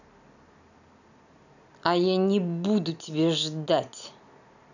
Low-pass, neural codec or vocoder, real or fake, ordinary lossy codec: 7.2 kHz; none; real; none